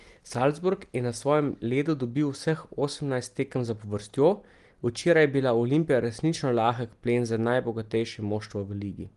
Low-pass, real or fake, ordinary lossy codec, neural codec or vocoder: 10.8 kHz; real; Opus, 24 kbps; none